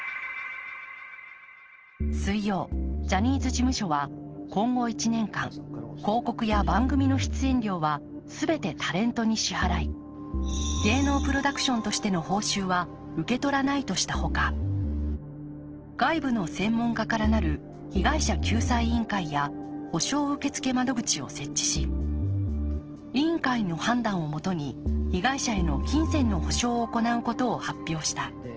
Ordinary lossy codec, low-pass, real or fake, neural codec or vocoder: Opus, 16 kbps; 7.2 kHz; real; none